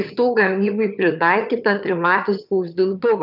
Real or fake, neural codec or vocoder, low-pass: fake; vocoder, 22.05 kHz, 80 mel bands, HiFi-GAN; 5.4 kHz